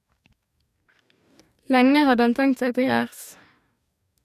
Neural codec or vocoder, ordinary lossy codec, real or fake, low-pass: codec, 44.1 kHz, 2.6 kbps, DAC; none; fake; 14.4 kHz